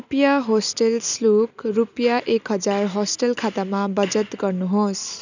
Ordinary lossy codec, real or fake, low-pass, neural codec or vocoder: none; real; 7.2 kHz; none